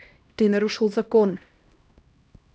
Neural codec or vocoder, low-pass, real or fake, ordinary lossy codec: codec, 16 kHz, 1 kbps, X-Codec, HuBERT features, trained on LibriSpeech; none; fake; none